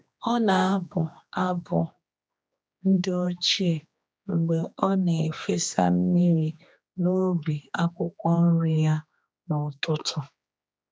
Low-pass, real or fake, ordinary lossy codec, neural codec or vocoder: none; fake; none; codec, 16 kHz, 2 kbps, X-Codec, HuBERT features, trained on general audio